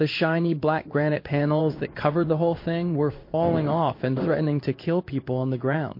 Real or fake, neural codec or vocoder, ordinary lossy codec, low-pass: fake; codec, 16 kHz in and 24 kHz out, 1 kbps, XY-Tokenizer; MP3, 32 kbps; 5.4 kHz